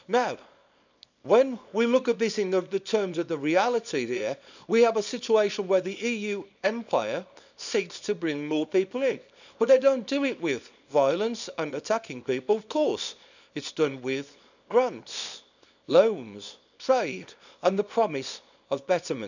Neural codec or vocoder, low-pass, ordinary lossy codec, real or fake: codec, 24 kHz, 0.9 kbps, WavTokenizer, small release; 7.2 kHz; none; fake